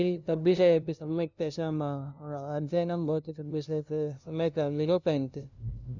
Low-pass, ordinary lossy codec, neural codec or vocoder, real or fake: 7.2 kHz; none; codec, 16 kHz, 0.5 kbps, FunCodec, trained on LibriTTS, 25 frames a second; fake